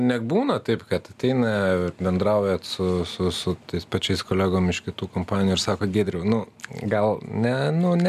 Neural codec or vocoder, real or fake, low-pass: none; real; 14.4 kHz